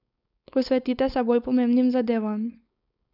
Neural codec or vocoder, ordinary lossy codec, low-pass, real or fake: codec, 16 kHz, 4.8 kbps, FACodec; none; 5.4 kHz; fake